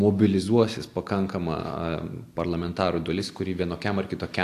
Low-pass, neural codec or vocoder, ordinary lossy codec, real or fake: 14.4 kHz; none; AAC, 64 kbps; real